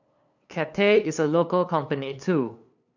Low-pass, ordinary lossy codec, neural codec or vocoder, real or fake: 7.2 kHz; none; codec, 16 kHz, 2 kbps, FunCodec, trained on LibriTTS, 25 frames a second; fake